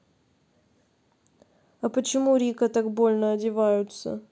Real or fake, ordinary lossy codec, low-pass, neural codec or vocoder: real; none; none; none